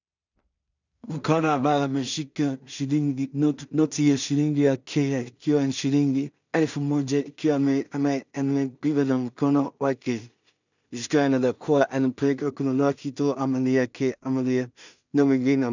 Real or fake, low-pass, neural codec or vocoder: fake; 7.2 kHz; codec, 16 kHz in and 24 kHz out, 0.4 kbps, LongCat-Audio-Codec, two codebook decoder